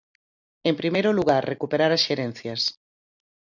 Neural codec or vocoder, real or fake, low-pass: none; real; 7.2 kHz